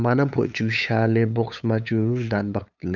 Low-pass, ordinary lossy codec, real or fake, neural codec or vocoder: 7.2 kHz; none; fake; codec, 16 kHz, 8 kbps, FunCodec, trained on LibriTTS, 25 frames a second